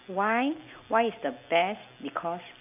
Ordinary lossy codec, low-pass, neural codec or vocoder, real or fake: none; 3.6 kHz; none; real